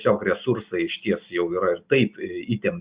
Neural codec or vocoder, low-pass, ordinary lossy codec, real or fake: none; 3.6 kHz; Opus, 64 kbps; real